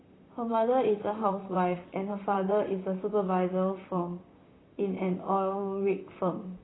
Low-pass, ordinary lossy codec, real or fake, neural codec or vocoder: 7.2 kHz; AAC, 16 kbps; fake; vocoder, 44.1 kHz, 128 mel bands, Pupu-Vocoder